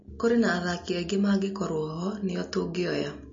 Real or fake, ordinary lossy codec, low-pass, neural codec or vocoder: real; MP3, 32 kbps; 7.2 kHz; none